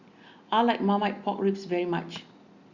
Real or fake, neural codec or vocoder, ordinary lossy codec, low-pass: real; none; Opus, 64 kbps; 7.2 kHz